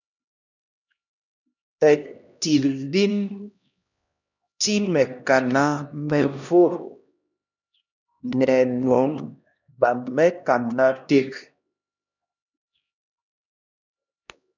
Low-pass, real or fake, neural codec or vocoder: 7.2 kHz; fake; codec, 16 kHz, 1 kbps, X-Codec, HuBERT features, trained on LibriSpeech